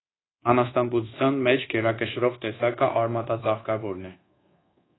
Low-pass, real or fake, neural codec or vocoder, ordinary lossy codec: 7.2 kHz; fake; codec, 24 kHz, 1.2 kbps, DualCodec; AAC, 16 kbps